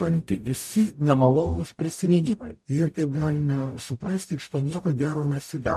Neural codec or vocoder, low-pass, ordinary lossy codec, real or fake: codec, 44.1 kHz, 0.9 kbps, DAC; 14.4 kHz; MP3, 64 kbps; fake